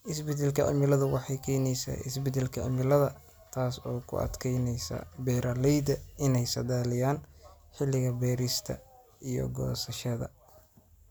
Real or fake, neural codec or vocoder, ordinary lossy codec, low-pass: real; none; none; none